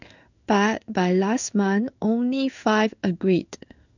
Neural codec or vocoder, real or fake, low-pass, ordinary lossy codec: codec, 16 kHz in and 24 kHz out, 1 kbps, XY-Tokenizer; fake; 7.2 kHz; none